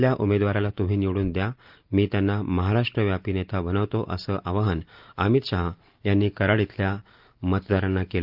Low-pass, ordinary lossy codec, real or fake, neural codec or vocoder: 5.4 kHz; Opus, 32 kbps; real; none